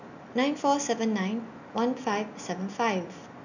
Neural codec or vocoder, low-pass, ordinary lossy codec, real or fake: none; 7.2 kHz; none; real